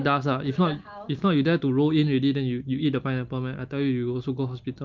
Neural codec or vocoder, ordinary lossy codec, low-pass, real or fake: none; Opus, 32 kbps; 7.2 kHz; real